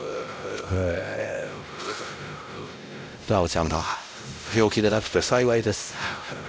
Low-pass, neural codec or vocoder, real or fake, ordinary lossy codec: none; codec, 16 kHz, 0.5 kbps, X-Codec, WavLM features, trained on Multilingual LibriSpeech; fake; none